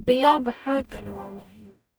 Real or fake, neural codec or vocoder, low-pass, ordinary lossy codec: fake; codec, 44.1 kHz, 0.9 kbps, DAC; none; none